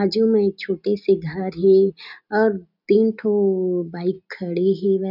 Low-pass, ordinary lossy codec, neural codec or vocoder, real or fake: 5.4 kHz; none; none; real